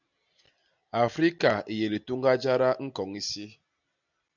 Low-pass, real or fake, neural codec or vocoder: 7.2 kHz; real; none